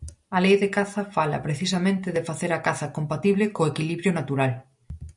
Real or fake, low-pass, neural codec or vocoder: real; 10.8 kHz; none